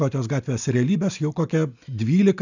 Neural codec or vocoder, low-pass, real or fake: none; 7.2 kHz; real